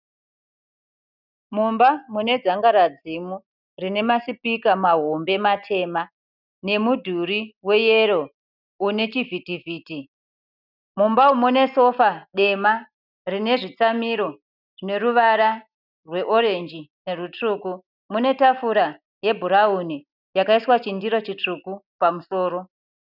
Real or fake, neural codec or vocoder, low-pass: real; none; 5.4 kHz